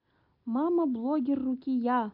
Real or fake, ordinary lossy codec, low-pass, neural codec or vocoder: real; MP3, 48 kbps; 5.4 kHz; none